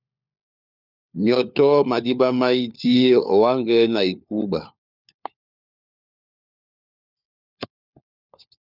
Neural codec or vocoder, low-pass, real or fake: codec, 16 kHz, 4 kbps, FunCodec, trained on LibriTTS, 50 frames a second; 5.4 kHz; fake